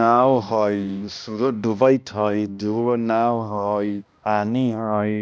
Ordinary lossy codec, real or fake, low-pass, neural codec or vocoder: none; fake; none; codec, 16 kHz, 1 kbps, X-Codec, HuBERT features, trained on balanced general audio